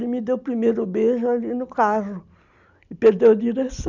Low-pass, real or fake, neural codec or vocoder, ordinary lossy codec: 7.2 kHz; real; none; none